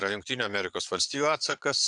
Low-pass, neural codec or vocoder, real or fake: 9.9 kHz; vocoder, 22.05 kHz, 80 mel bands, Vocos; fake